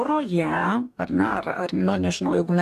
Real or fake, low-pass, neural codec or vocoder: fake; 14.4 kHz; codec, 44.1 kHz, 2.6 kbps, DAC